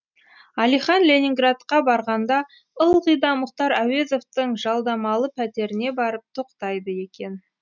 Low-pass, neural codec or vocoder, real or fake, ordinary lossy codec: 7.2 kHz; none; real; none